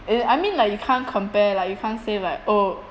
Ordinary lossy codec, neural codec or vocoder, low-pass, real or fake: none; none; none; real